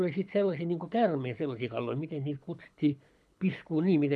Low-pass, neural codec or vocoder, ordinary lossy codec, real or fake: none; codec, 24 kHz, 6 kbps, HILCodec; none; fake